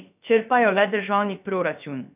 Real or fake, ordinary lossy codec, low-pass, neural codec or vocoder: fake; none; 3.6 kHz; codec, 16 kHz, about 1 kbps, DyCAST, with the encoder's durations